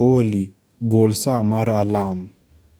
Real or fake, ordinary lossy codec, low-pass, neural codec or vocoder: fake; none; none; codec, 44.1 kHz, 2.6 kbps, DAC